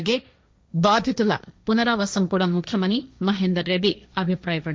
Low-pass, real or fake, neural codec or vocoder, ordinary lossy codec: none; fake; codec, 16 kHz, 1.1 kbps, Voila-Tokenizer; none